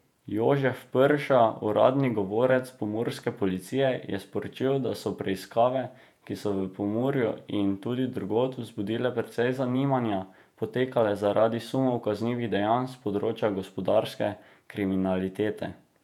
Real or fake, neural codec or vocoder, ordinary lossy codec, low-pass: fake; vocoder, 48 kHz, 128 mel bands, Vocos; none; 19.8 kHz